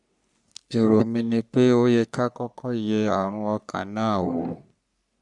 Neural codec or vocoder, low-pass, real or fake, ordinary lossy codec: codec, 44.1 kHz, 3.4 kbps, Pupu-Codec; 10.8 kHz; fake; none